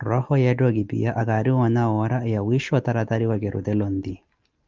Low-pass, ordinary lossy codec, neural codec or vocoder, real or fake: 7.2 kHz; Opus, 32 kbps; none; real